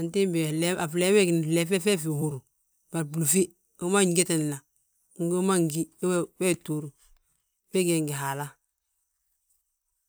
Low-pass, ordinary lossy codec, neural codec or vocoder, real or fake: none; none; vocoder, 44.1 kHz, 128 mel bands every 256 samples, BigVGAN v2; fake